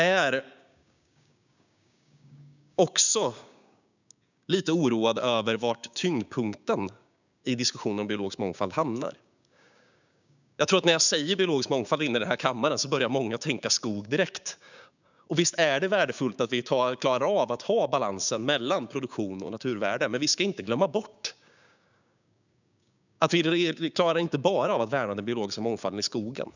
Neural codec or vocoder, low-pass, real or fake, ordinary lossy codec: codec, 16 kHz, 6 kbps, DAC; 7.2 kHz; fake; none